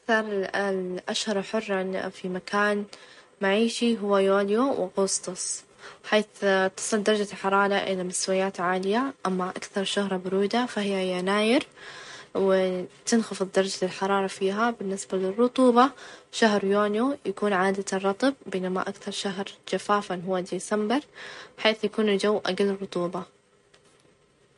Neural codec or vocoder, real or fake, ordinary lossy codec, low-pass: none; real; MP3, 48 kbps; 14.4 kHz